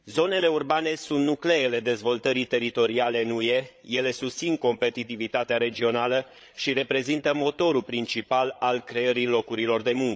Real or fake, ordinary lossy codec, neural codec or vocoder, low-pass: fake; none; codec, 16 kHz, 8 kbps, FreqCodec, larger model; none